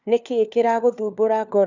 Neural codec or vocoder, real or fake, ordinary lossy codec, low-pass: codec, 16 kHz, 4 kbps, FunCodec, trained on LibriTTS, 50 frames a second; fake; none; 7.2 kHz